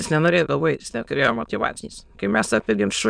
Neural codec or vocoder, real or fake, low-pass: autoencoder, 22.05 kHz, a latent of 192 numbers a frame, VITS, trained on many speakers; fake; 9.9 kHz